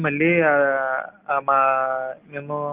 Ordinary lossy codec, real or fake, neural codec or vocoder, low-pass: Opus, 24 kbps; real; none; 3.6 kHz